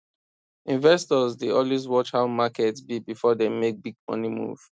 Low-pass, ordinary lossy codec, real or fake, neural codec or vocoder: none; none; real; none